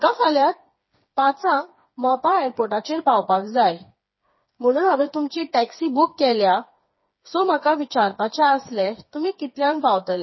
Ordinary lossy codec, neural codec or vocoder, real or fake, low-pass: MP3, 24 kbps; codec, 16 kHz, 4 kbps, FreqCodec, smaller model; fake; 7.2 kHz